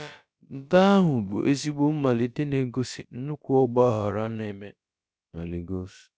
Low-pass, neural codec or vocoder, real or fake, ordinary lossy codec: none; codec, 16 kHz, about 1 kbps, DyCAST, with the encoder's durations; fake; none